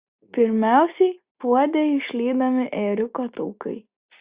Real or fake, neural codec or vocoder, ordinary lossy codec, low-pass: real; none; Opus, 64 kbps; 3.6 kHz